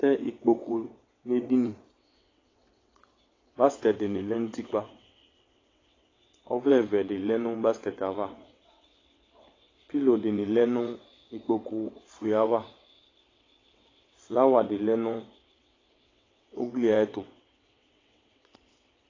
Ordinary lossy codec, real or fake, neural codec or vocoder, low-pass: AAC, 32 kbps; fake; vocoder, 22.05 kHz, 80 mel bands, WaveNeXt; 7.2 kHz